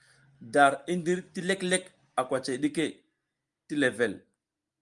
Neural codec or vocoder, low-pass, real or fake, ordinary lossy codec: none; 10.8 kHz; real; Opus, 32 kbps